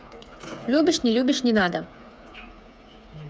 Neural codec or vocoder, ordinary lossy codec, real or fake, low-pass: codec, 16 kHz, 8 kbps, FreqCodec, smaller model; none; fake; none